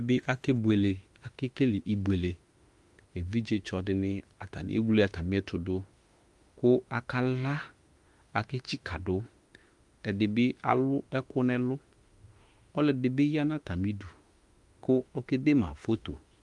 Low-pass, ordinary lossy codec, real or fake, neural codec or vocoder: 10.8 kHz; Opus, 32 kbps; fake; autoencoder, 48 kHz, 32 numbers a frame, DAC-VAE, trained on Japanese speech